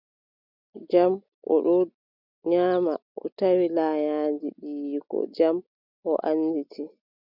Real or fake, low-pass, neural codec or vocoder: real; 5.4 kHz; none